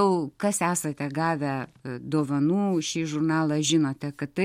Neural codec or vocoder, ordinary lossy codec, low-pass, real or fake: none; MP3, 64 kbps; 19.8 kHz; real